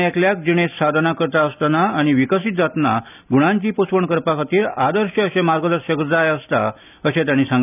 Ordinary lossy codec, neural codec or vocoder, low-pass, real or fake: none; none; 3.6 kHz; real